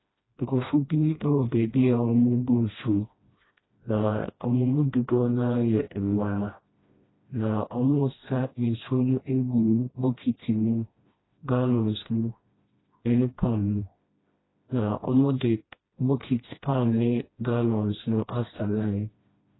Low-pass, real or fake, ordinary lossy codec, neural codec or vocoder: 7.2 kHz; fake; AAC, 16 kbps; codec, 16 kHz, 1 kbps, FreqCodec, smaller model